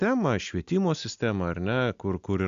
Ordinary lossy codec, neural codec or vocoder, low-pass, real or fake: AAC, 64 kbps; none; 7.2 kHz; real